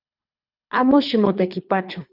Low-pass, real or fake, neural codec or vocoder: 5.4 kHz; fake; codec, 24 kHz, 3 kbps, HILCodec